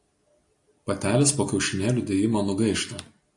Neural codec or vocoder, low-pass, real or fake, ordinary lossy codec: none; 10.8 kHz; real; AAC, 48 kbps